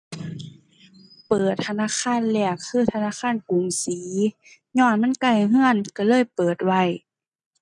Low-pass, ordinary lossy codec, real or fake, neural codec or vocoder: 10.8 kHz; none; real; none